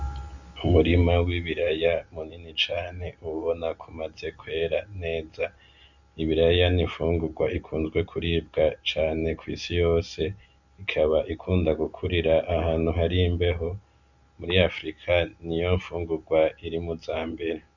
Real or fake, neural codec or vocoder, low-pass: real; none; 7.2 kHz